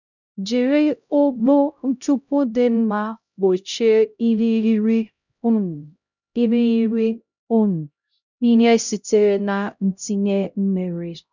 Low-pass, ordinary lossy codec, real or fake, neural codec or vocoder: 7.2 kHz; none; fake; codec, 16 kHz, 0.5 kbps, X-Codec, HuBERT features, trained on LibriSpeech